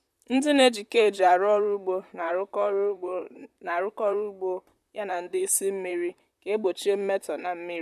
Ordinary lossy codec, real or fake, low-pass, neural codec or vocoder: none; fake; 14.4 kHz; vocoder, 44.1 kHz, 128 mel bands, Pupu-Vocoder